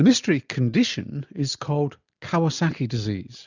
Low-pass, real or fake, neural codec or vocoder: 7.2 kHz; real; none